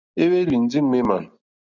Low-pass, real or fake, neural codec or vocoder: 7.2 kHz; real; none